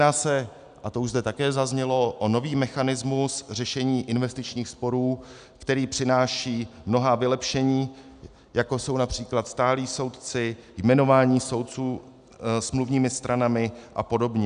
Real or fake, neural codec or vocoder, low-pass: real; none; 9.9 kHz